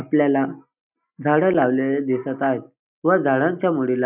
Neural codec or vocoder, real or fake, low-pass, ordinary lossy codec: none; real; 3.6 kHz; none